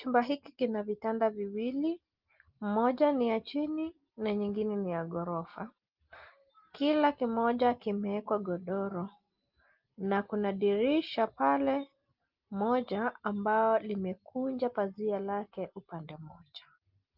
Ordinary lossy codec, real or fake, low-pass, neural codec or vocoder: Opus, 32 kbps; real; 5.4 kHz; none